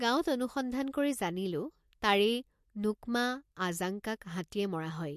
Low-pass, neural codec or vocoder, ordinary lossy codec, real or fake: 14.4 kHz; none; MP3, 64 kbps; real